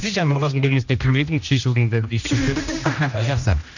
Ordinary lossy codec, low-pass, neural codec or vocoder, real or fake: none; 7.2 kHz; codec, 16 kHz, 1 kbps, X-Codec, HuBERT features, trained on general audio; fake